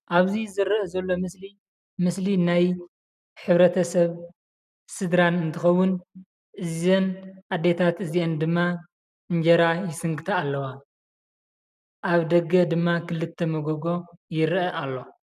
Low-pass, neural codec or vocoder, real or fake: 14.4 kHz; none; real